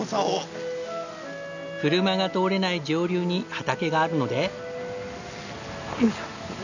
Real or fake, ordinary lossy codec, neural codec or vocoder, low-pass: real; none; none; 7.2 kHz